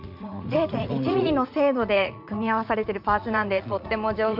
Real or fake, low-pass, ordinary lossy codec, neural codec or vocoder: fake; 5.4 kHz; none; vocoder, 44.1 kHz, 80 mel bands, Vocos